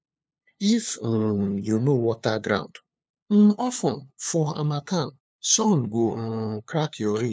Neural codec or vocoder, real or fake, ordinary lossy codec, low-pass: codec, 16 kHz, 2 kbps, FunCodec, trained on LibriTTS, 25 frames a second; fake; none; none